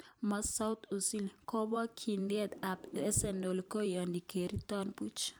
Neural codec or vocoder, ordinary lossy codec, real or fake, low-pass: vocoder, 44.1 kHz, 128 mel bands every 512 samples, BigVGAN v2; none; fake; none